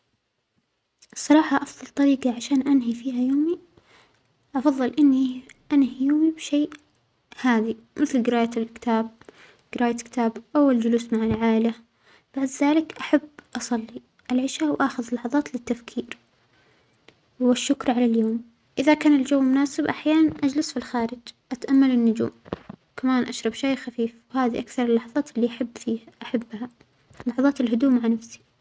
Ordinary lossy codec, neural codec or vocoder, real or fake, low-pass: none; none; real; none